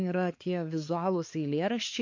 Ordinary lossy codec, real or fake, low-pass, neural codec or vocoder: MP3, 48 kbps; fake; 7.2 kHz; codec, 16 kHz, 4 kbps, X-Codec, HuBERT features, trained on balanced general audio